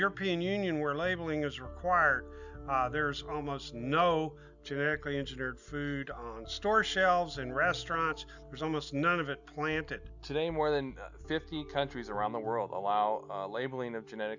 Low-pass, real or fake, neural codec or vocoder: 7.2 kHz; real; none